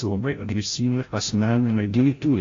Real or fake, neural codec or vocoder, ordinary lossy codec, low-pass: fake; codec, 16 kHz, 0.5 kbps, FreqCodec, larger model; AAC, 32 kbps; 7.2 kHz